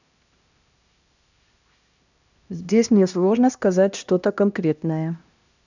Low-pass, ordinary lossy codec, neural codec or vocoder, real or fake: 7.2 kHz; none; codec, 16 kHz, 1 kbps, X-Codec, HuBERT features, trained on LibriSpeech; fake